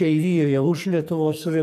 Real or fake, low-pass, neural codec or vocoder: fake; 14.4 kHz; codec, 44.1 kHz, 2.6 kbps, SNAC